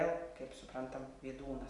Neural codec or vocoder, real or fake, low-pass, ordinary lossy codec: none; real; 10.8 kHz; MP3, 96 kbps